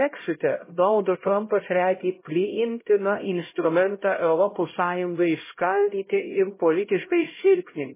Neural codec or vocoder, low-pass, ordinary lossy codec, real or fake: codec, 16 kHz, 0.5 kbps, X-Codec, HuBERT features, trained on LibriSpeech; 3.6 kHz; MP3, 16 kbps; fake